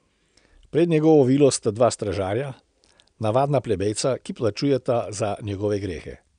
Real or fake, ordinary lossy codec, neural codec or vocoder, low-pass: real; none; none; 9.9 kHz